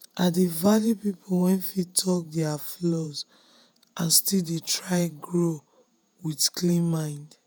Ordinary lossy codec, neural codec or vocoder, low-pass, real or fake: none; vocoder, 48 kHz, 128 mel bands, Vocos; none; fake